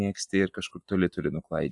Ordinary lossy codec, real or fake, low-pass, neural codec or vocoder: AAC, 64 kbps; real; 10.8 kHz; none